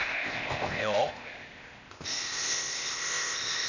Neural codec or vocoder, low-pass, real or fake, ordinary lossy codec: codec, 16 kHz, 0.8 kbps, ZipCodec; 7.2 kHz; fake; none